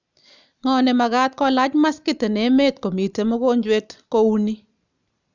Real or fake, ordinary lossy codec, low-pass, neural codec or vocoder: real; none; 7.2 kHz; none